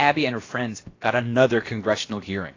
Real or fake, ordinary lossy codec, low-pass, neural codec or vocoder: fake; AAC, 32 kbps; 7.2 kHz; codec, 16 kHz, about 1 kbps, DyCAST, with the encoder's durations